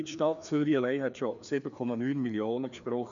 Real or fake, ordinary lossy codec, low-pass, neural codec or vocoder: fake; none; 7.2 kHz; codec, 16 kHz, 2 kbps, FreqCodec, larger model